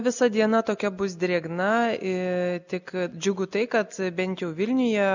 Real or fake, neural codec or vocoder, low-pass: real; none; 7.2 kHz